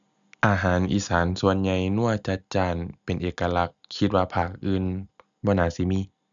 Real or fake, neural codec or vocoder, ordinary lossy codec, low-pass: real; none; none; 7.2 kHz